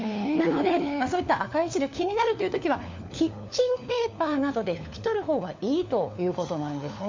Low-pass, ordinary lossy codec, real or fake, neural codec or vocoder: 7.2 kHz; MP3, 64 kbps; fake; codec, 16 kHz, 4 kbps, FunCodec, trained on LibriTTS, 50 frames a second